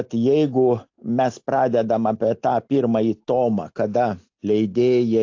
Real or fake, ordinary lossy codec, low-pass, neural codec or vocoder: real; AAC, 48 kbps; 7.2 kHz; none